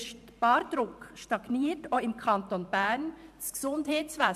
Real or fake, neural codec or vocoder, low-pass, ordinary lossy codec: fake; vocoder, 44.1 kHz, 128 mel bands every 512 samples, BigVGAN v2; 14.4 kHz; AAC, 96 kbps